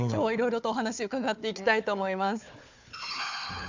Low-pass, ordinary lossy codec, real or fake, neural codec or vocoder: 7.2 kHz; MP3, 64 kbps; fake; codec, 16 kHz, 4 kbps, FunCodec, trained on Chinese and English, 50 frames a second